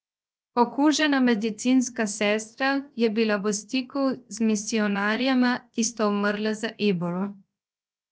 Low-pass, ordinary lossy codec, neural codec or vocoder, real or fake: none; none; codec, 16 kHz, 0.7 kbps, FocalCodec; fake